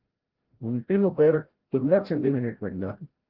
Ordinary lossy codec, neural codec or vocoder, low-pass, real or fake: Opus, 16 kbps; codec, 16 kHz, 0.5 kbps, FreqCodec, larger model; 5.4 kHz; fake